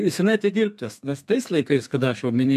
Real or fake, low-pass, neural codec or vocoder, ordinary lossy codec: fake; 14.4 kHz; codec, 32 kHz, 1.9 kbps, SNAC; AAC, 96 kbps